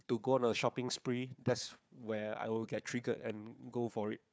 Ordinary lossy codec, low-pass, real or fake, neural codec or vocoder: none; none; fake; codec, 16 kHz, 4 kbps, FunCodec, trained on Chinese and English, 50 frames a second